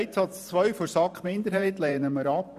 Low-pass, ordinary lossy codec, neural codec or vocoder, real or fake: 14.4 kHz; none; vocoder, 44.1 kHz, 128 mel bands every 512 samples, BigVGAN v2; fake